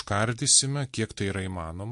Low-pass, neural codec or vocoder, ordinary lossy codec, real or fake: 14.4 kHz; none; MP3, 48 kbps; real